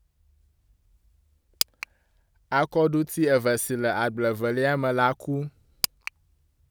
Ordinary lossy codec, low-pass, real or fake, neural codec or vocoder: none; none; real; none